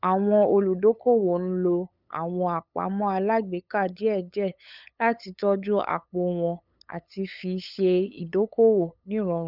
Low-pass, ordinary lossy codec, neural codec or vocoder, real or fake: 5.4 kHz; none; codec, 16 kHz, 8 kbps, FunCodec, trained on LibriTTS, 25 frames a second; fake